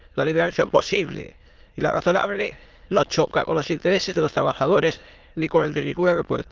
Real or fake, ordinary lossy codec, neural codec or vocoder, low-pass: fake; Opus, 24 kbps; autoencoder, 22.05 kHz, a latent of 192 numbers a frame, VITS, trained on many speakers; 7.2 kHz